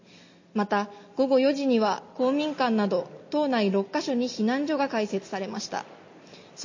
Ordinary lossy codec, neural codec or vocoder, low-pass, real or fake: MP3, 32 kbps; none; 7.2 kHz; real